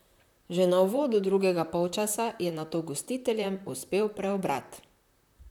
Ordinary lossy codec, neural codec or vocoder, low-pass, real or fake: none; vocoder, 44.1 kHz, 128 mel bands, Pupu-Vocoder; 19.8 kHz; fake